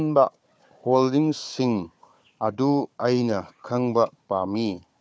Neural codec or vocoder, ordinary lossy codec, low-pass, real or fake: codec, 16 kHz, 4 kbps, FunCodec, trained on Chinese and English, 50 frames a second; none; none; fake